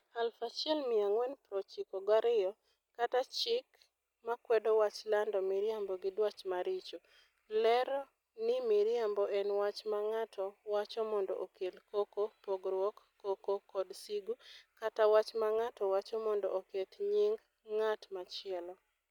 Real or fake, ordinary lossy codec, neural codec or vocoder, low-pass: real; none; none; 19.8 kHz